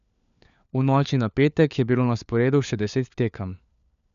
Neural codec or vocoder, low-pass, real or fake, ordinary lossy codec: codec, 16 kHz, 4 kbps, FunCodec, trained on LibriTTS, 50 frames a second; 7.2 kHz; fake; none